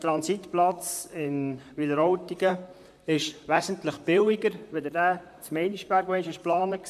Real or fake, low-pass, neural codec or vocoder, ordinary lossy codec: fake; 14.4 kHz; vocoder, 44.1 kHz, 128 mel bands, Pupu-Vocoder; AAC, 96 kbps